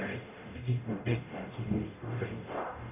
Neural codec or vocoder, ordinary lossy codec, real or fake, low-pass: codec, 44.1 kHz, 0.9 kbps, DAC; none; fake; 3.6 kHz